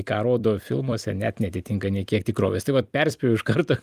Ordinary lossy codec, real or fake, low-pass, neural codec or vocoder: Opus, 32 kbps; fake; 14.4 kHz; vocoder, 44.1 kHz, 128 mel bands every 256 samples, BigVGAN v2